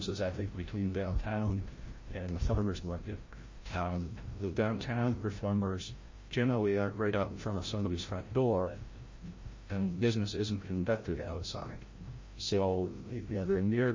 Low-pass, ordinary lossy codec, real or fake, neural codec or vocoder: 7.2 kHz; MP3, 32 kbps; fake; codec, 16 kHz, 0.5 kbps, FreqCodec, larger model